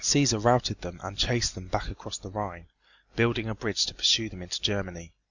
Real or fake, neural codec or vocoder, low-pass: real; none; 7.2 kHz